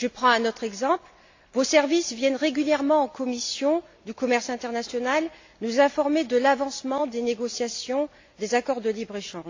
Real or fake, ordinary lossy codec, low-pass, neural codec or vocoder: real; AAC, 48 kbps; 7.2 kHz; none